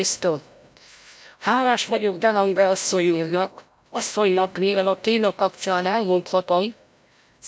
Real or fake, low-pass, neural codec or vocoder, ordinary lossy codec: fake; none; codec, 16 kHz, 0.5 kbps, FreqCodec, larger model; none